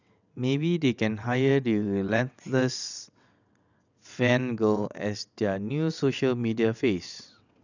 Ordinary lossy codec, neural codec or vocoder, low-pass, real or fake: none; vocoder, 22.05 kHz, 80 mel bands, WaveNeXt; 7.2 kHz; fake